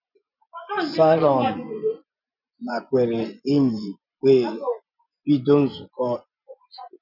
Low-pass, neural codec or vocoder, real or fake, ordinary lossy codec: 5.4 kHz; vocoder, 24 kHz, 100 mel bands, Vocos; fake; none